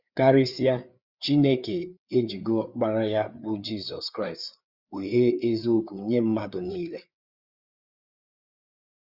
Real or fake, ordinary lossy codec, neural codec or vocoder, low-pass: fake; Opus, 64 kbps; codec, 16 kHz, 4 kbps, FreqCodec, larger model; 5.4 kHz